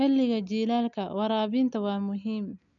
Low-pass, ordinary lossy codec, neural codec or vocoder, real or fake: 7.2 kHz; none; none; real